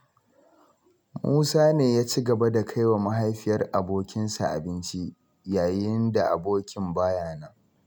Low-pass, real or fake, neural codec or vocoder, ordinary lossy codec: none; real; none; none